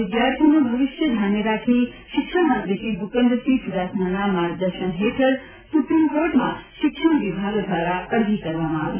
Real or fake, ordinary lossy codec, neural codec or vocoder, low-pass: real; none; none; 3.6 kHz